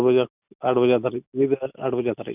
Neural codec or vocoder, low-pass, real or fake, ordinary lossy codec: none; 3.6 kHz; real; none